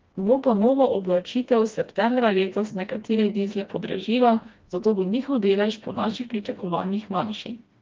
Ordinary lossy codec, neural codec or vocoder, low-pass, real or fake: Opus, 24 kbps; codec, 16 kHz, 1 kbps, FreqCodec, smaller model; 7.2 kHz; fake